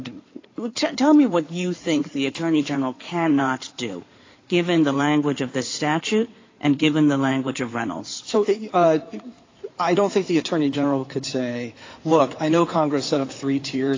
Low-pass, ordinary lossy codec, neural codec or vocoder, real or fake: 7.2 kHz; AAC, 32 kbps; codec, 16 kHz in and 24 kHz out, 2.2 kbps, FireRedTTS-2 codec; fake